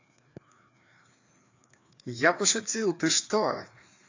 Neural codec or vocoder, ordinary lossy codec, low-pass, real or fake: codec, 16 kHz, 2 kbps, FreqCodec, larger model; AAC, 48 kbps; 7.2 kHz; fake